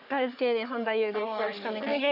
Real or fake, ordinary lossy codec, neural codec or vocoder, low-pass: fake; none; codec, 44.1 kHz, 3.4 kbps, Pupu-Codec; 5.4 kHz